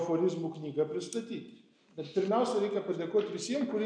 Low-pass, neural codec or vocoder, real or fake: 9.9 kHz; none; real